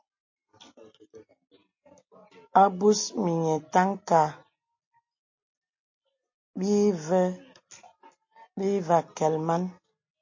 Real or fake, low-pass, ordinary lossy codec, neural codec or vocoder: real; 7.2 kHz; MP3, 32 kbps; none